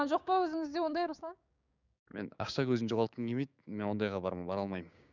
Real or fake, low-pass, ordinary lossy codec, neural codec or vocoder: fake; 7.2 kHz; none; codec, 16 kHz, 6 kbps, DAC